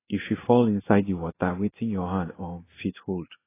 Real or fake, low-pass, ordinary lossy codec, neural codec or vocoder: fake; 3.6 kHz; AAC, 16 kbps; codec, 16 kHz in and 24 kHz out, 1 kbps, XY-Tokenizer